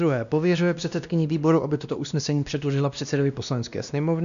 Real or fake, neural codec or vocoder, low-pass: fake; codec, 16 kHz, 1 kbps, X-Codec, WavLM features, trained on Multilingual LibriSpeech; 7.2 kHz